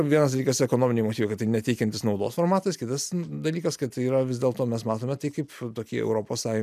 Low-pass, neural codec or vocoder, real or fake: 14.4 kHz; none; real